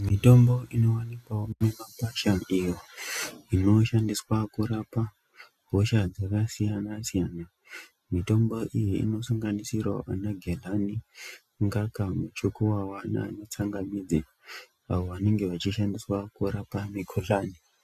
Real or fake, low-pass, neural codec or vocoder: real; 14.4 kHz; none